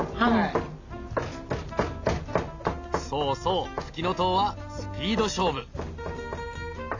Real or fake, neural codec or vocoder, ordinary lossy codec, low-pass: real; none; AAC, 48 kbps; 7.2 kHz